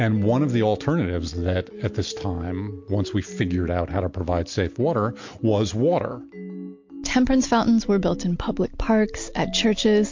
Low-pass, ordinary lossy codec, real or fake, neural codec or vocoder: 7.2 kHz; MP3, 48 kbps; real; none